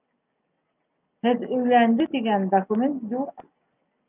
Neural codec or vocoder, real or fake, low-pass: none; real; 3.6 kHz